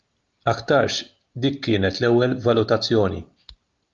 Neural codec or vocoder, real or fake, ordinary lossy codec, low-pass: none; real; Opus, 24 kbps; 7.2 kHz